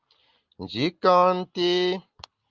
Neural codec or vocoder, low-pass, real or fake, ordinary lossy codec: none; 7.2 kHz; real; Opus, 24 kbps